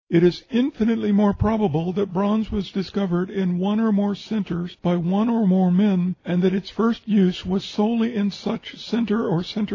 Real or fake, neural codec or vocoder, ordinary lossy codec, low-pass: real; none; AAC, 32 kbps; 7.2 kHz